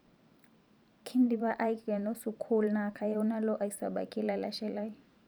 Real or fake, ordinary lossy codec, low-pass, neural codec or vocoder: fake; none; none; vocoder, 44.1 kHz, 128 mel bands every 512 samples, BigVGAN v2